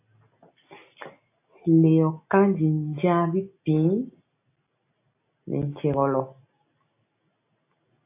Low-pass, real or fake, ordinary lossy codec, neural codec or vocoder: 3.6 kHz; real; AAC, 24 kbps; none